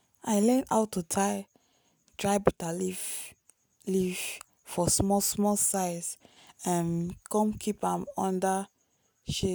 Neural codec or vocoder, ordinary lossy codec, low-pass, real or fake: none; none; none; real